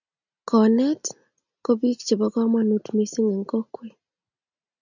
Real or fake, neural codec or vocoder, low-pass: real; none; 7.2 kHz